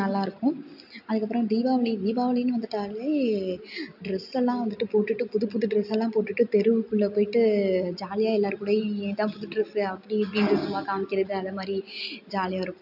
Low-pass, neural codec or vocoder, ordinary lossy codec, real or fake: 5.4 kHz; none; AAC, 48 kbps; real